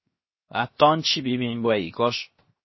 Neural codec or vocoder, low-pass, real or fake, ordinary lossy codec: codec, 16 kHz, 0.7 kbps, FocalCodec; 7.2 kHz; fake; MP3, 24 kbps